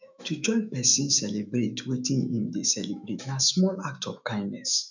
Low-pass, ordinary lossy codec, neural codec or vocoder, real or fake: 7.2 kHz; none; autoencoder, 48 kHz, 128 numbers a frame, DAC-VAE, trained on Japanese speech; fake